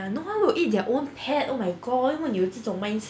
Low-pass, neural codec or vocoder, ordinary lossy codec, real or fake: none; none; none; real